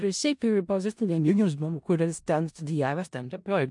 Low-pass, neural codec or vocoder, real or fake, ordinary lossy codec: 10.8 kHz; codec, 16 kHz in and 24 kHz out, 0.4 kbps, LongCat-Audio-Codec, four codebook decoder; fake; MP3, 64 kbps